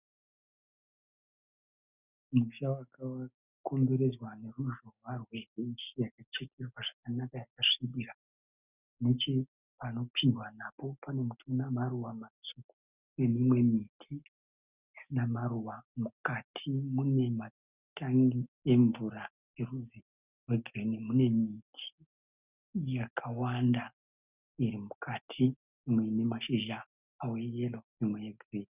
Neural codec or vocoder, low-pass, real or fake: none; 3.6 kHz; real